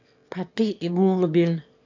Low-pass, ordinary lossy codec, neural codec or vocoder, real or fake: 7.2 kHz; AAC, 48 kbps; autoencoder, 22.05 kHz, a latent of 192 numbers a frame, VITS, trained on one speaker; fake